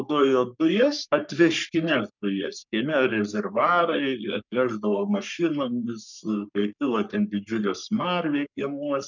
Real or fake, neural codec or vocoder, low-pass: fake; codec, 44.1 kHz, 3.4 kbps, Pupu-Codec; 7.2 kHz